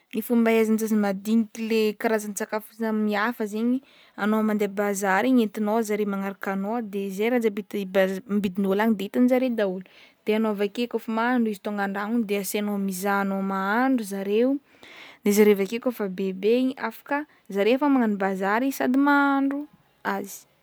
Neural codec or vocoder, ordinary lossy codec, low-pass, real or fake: none; none; none; real